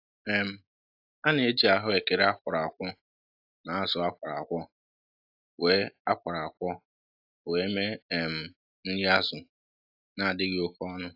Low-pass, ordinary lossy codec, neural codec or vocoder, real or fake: 5.4 kHz; AAC, 48 kbps; none; real